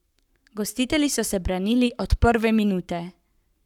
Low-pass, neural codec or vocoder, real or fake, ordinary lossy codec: 19.8 kHz; codec, 44.1 kHz, 7.8 kbps, Pupu-Codec; fake; none